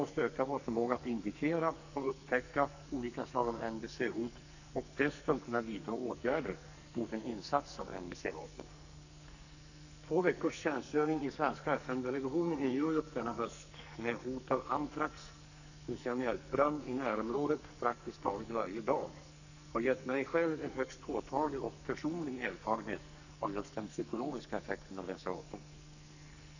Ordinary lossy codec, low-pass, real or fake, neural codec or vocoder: none; 7.2 kHz; fake; codec, 44.1 kHz, 2.6 kbps, SNAC